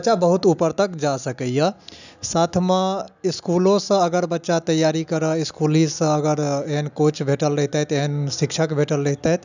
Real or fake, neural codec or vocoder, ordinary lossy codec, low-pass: real; none; none; 7.2 kHz